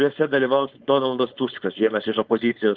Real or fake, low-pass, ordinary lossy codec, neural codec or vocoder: fake; 7.2 kHz; Opus, 32 kbps; codec, 16 kHz, 4.8 kbps, FACodec